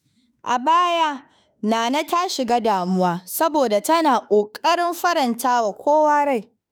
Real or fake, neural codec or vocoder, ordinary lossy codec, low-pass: fake; autoencoder, 48 kHz, 32 numbers a frame, DAC-VAE, trained on Japanese speech; none; none